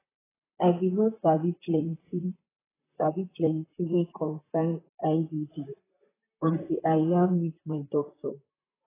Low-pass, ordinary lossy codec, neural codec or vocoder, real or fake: 3.6 kHz; AAC, 16 kbps; vocoder, 44.1 kHz, 128 mel bands, Pupu-Vocoder; fake